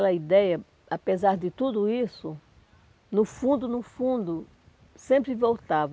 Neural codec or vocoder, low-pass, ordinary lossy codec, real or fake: none; none; none; real